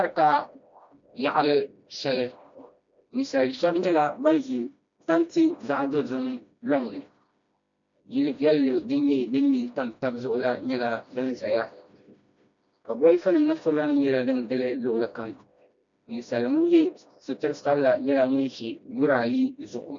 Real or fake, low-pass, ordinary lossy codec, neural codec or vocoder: fake; 7.2 kHz; MP3, 64 kbps; codec, 16 kHz, 1 kbps, FreqCodec, smaller model